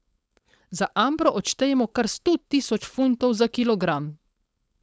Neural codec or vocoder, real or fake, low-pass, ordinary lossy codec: codec, 16 kHz, 4.8 kbps, FACodec; fake; none; none